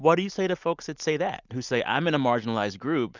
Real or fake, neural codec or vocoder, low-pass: real; none; 7.2 kHz